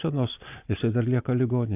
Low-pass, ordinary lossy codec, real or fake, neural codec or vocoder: 3.6 kHz; AAC, 32 kbps; real; none